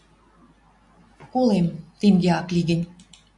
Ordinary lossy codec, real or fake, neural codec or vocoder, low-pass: MP3, 64 kbps; real; none; 10.8 kHz